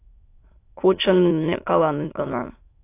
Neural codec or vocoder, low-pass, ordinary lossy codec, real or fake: autoencoder, 22.05 kHz, a latent of 192 numbers a frame, VITS, trained on many speakers; 3.6 kHz; AAC, 24 kbps; fake